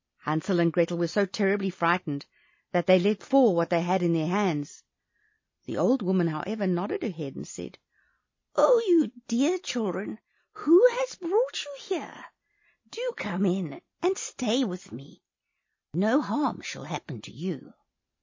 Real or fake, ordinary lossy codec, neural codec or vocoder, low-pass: real; MP3, 32 kbps; none; 7.2 kHz